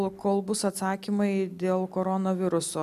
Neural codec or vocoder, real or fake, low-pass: none; real; 14.4 kHz